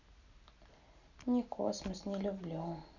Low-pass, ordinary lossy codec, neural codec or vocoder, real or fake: 7.2 kHz; none; none; real